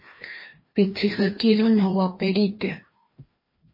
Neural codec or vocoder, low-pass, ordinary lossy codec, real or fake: codec, 16 kHz, 1 kbps, FreqCodec, larger model; 5.4 kHz; MP3, 24 kbps; fake